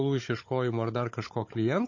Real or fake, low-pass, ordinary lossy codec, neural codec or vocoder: fake; 7.2 kHz; MP3, 32 kbps; codec, 16 kHz, 16 kbps, FunCodec, trained on Chinese and English, 50 frames a second